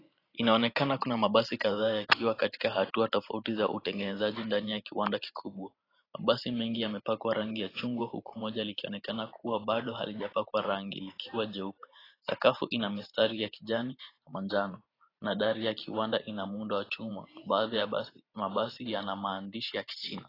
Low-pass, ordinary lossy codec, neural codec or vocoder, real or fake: 5.4 kHz; AAC, 24 kbps; vocoder, 44.1 kHz, 128 mel bands every 512 samples, BigVGAN v2; fake